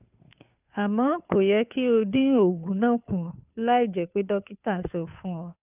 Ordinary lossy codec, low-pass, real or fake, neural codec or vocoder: none; 3.6 kHz; fake; codec, 16 kHz, 2 kbps, FunCodec, trained on Chinese and English, 25 frames a second